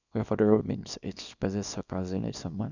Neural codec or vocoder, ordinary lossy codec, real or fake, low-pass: codec, 24 kHz, 0.9 kbps, WavTokenizer, small release; none; fake; 7.2 kHz